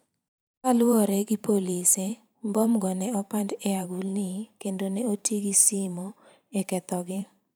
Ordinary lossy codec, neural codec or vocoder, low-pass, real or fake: none; vocoder, 44.1 kHz, 128 mel bands every 512 samples, BigVGAN v2; none; fake